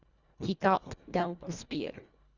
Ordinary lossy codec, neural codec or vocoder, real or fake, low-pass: Opus, 64 kbps; codec, 24 kHz, 1.5 kbps, HILCodec; fake; 7.2 kHz